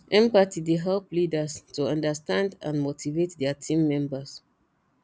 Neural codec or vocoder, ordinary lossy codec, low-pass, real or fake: none; none; none; real